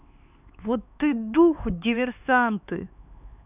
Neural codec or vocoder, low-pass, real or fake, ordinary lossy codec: codec, 16 kHz, 4 kbps, X-Codec, HuBERT features, trained on LibriSpeech; 3.6 kHz; fake; none